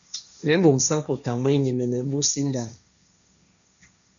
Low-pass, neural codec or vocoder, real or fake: 7.2 kHz; codec, 16 kHz, 1.1 kbps, Voila-Tokenizer; fake